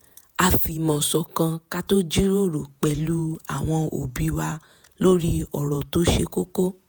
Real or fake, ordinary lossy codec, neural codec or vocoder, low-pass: fake; none; vocoder, 48 kHz, 128 mel bands, Vocos; none